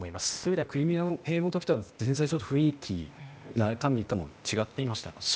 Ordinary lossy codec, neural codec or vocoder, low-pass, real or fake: none; codec, 16 kHz, 0.8 kbps, ZipCodec; none; fake